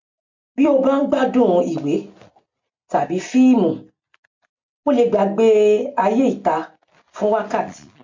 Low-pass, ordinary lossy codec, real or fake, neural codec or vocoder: 7.2 kHz; MP3, 48 kbps; real; none